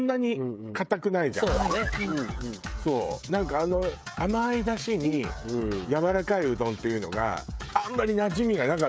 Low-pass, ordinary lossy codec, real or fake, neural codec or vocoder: none; none; fake; codec, 16 kHz, 16 kbps, FreqCodec, smaller model